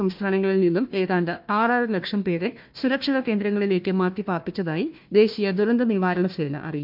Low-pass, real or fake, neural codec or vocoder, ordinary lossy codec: 5.4 kHz; fake; codec, 16 kHz, 1 kbps, FunCodec, trained on Chinese and English, 50 frames a second; MP3, 48 kbps